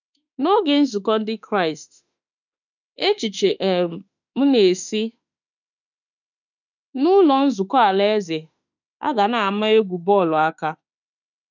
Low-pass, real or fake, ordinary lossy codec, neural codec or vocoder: 7.2 kHz; fake; none; autoencoder, 48 kHz, 32 numbers a frame, DAC-VAE, trained on Japanese speech